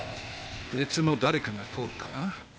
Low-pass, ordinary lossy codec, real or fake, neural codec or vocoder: none; none; fake; codec, 16 kHz, 0.8 kbps, ZipCodec